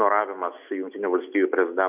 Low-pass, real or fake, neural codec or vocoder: 3.6 kHz; real; none